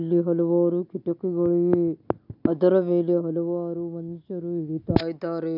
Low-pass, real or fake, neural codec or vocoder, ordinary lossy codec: 5.4 kHz; real; none; none